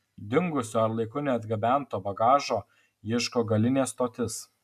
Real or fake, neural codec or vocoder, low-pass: real; none; 14.4 kHz